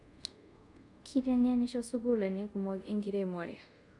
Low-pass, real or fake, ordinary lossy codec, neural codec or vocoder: 10.8 kHz; fake; none; codec, 24 kHz, 0.5 kbps, DualCodec